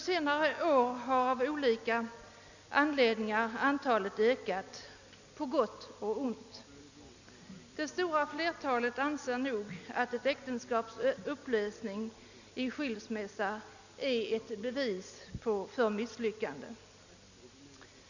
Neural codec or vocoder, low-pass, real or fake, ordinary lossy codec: none; 7.2 kHz; real; none